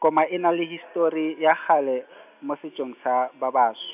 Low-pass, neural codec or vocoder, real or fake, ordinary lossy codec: 3.6 kHz; none; real; none